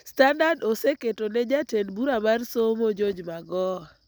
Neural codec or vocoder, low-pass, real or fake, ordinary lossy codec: none; none; real; none